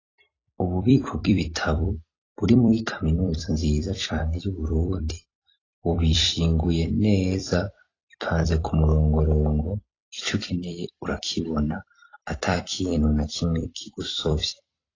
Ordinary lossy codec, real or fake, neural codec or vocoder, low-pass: AAC, 32 kbps; fake; vocoder, 44.1 kHz, 128 mel bands every 256 samples, BigVGAN v2; 7.2 kHz